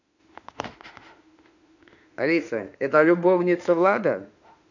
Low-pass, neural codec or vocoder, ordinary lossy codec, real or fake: 7.2 kHz; autoencoder, 48 kHz, 32 numbers a frame, DAC-VAE, trained on Japanese speech; none; fake